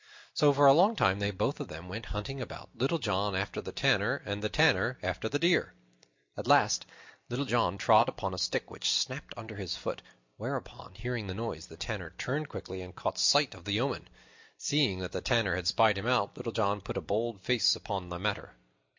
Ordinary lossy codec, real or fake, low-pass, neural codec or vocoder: MP3, 64 kbps; real; 7.2 kHz; none